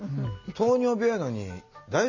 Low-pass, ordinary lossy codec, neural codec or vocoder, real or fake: 7.2 kHz; none; none; real